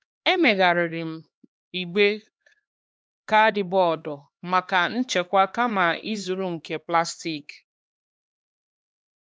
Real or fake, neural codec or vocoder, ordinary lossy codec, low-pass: fake; codec, 16 kHz, 2 kbps, X-Codec, HuBERT features, trained on LibriSpeech; none; none